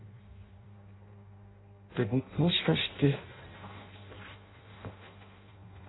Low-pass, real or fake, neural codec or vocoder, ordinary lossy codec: 7.2 kHz; fake; codec, 16 kHz in and 24 kHz out, 0.6 kbps, FireRedTTS-2 codec; AAC, 16 kbps